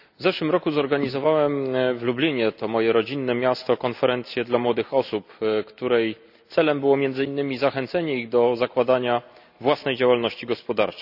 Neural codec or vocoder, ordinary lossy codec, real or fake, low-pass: none; none; real; 5.4 kHz